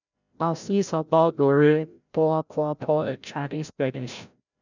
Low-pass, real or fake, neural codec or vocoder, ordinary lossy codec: 7.2 kHz; fake; codec, 16 kHz, 0.5 kbps, FreqCodec, larger model; none